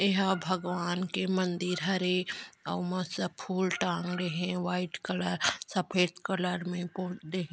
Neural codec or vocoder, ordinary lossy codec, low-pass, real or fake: none; none; none; real